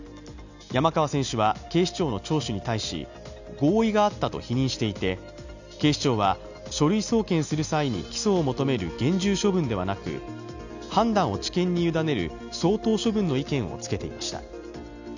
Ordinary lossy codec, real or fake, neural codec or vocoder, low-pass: none; real; none; 7.2 kHz